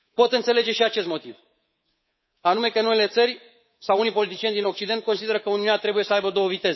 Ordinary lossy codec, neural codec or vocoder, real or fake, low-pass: MP3, 24 kbps; codec, 24 kHz, 3.1 kbps, DualCodec; fake; 7.2 kHz